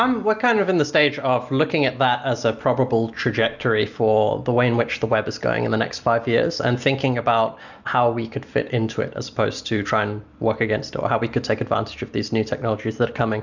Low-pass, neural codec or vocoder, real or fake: 7.2 kHz; none; real